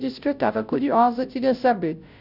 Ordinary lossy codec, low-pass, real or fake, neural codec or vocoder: none; 5.4 kHz; fake; codec, 16 kHz, 0.5 kbps, FunCodec, trained on Chinese and English, 25 frames a second